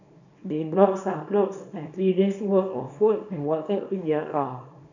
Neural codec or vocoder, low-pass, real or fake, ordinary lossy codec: codec, 24 kHz, 0.9 kbps, WavTokenizer, small release; 7.2 kHz; fake; none